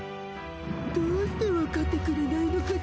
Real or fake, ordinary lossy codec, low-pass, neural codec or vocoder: real; none; none; none